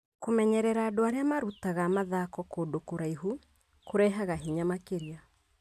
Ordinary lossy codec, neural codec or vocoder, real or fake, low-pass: none; none; real; 14.4 kHz